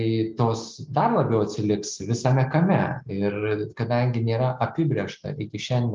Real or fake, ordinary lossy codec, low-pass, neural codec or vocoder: real; Opus, 24 kbps; 7.2 kHz; none